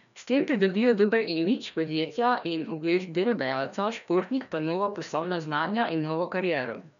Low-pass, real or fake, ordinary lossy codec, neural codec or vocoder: 7.2 kHz; fake; none; codec, 16 kHz, 1 kbps, FreqCodec, larger model